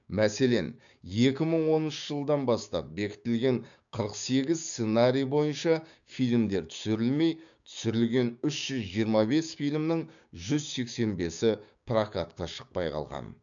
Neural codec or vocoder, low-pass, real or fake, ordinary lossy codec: codec, 16 kHz, 6 kbps, DAC; 7.2 kHz; fake; none